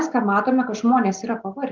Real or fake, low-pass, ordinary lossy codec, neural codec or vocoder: real; 7.2 kHz; Opus, 24 kbps; none